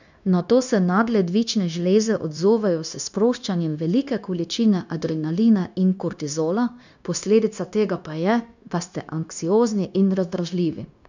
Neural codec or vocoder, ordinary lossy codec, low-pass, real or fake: codec, 16 kHz, 0.9 kbps, LongCat-Audio-Codec; none; 7.2 kHz; fake